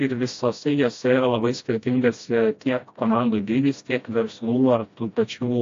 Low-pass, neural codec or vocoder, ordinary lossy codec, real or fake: 7.2 kHz; codec, 16 kHz, 1 kbps, FreqCodec, smaller model; AAC, 48 kbps; fake